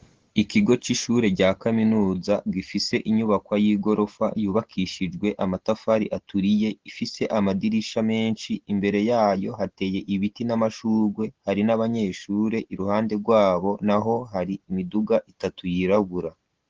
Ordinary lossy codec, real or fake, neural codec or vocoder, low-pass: Opus, 16 kbps; real; none; 7.2 kHz